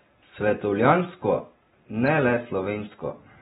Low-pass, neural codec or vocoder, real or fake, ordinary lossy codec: 19.8 kHz; none; real; AAC, 16 kbps